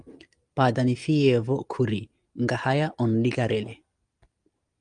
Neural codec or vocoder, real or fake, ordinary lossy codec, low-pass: none; real; Opus, 32 kbps; 9.9 kHz